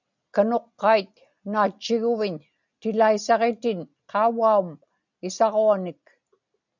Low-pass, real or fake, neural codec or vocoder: 7.2 kHz; real; none